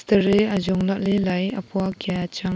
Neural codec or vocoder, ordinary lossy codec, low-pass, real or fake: none; none; none; real